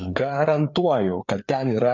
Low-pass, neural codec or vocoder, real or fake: 7.2 kHz; codec, 16 kHz, 4 kbps, FreqCodec, larger model; fake